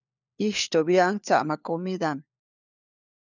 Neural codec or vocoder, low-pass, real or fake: codec, 16 kHz, 4 kbps, FunCodec, trained on LibriTTS, 50 frames a second; 7.2 kHz; fake